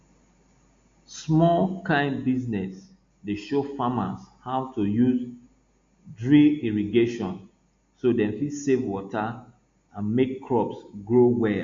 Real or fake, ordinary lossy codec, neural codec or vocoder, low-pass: real; MP3, 48 kbps; none; 7.2 kHz